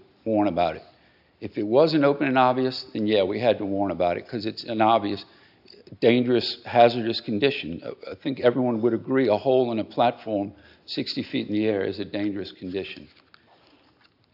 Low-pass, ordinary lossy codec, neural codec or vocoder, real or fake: 5.4 kHz; AAC, 48 kbps; none; real